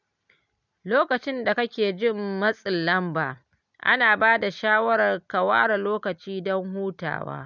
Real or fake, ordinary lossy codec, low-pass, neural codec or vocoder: real; none; 7.2 kHz; none